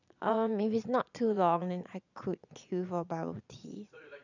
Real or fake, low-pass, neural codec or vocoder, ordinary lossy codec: fake; 7.2 kHz; vocoder, 22.05 kHz, 80 mel bands, Vocos; none